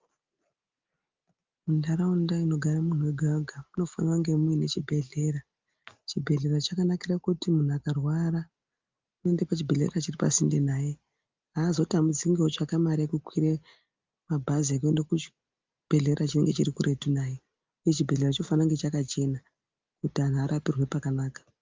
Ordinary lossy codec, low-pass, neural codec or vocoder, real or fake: Opus, 24 kbps; 7.2 kHz; none; real